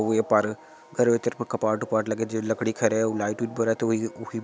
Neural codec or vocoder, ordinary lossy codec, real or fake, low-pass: none; none; real; none